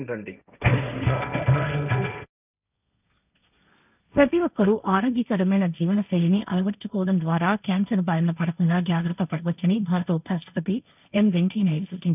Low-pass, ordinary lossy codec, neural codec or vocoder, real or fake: 3.6 kHz; none; codec, 16 kHz, 1.1 kbps, Voila-Tokenizer; fake